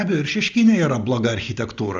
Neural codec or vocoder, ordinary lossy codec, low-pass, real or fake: none; Opus, 32 kbps; 7.2 kHz; real